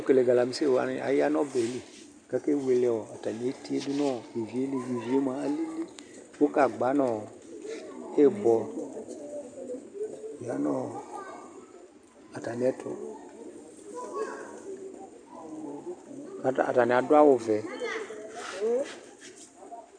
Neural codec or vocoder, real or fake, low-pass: none; real; 9.9 kHz